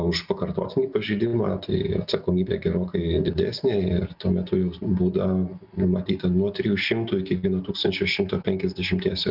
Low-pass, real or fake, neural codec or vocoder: 5.4 kHz; real; none